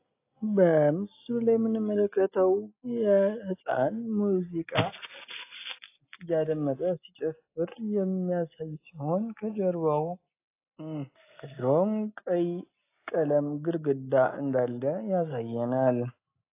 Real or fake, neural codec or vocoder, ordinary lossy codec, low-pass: real; none; AAC, 24 kbps; 3.6 kHz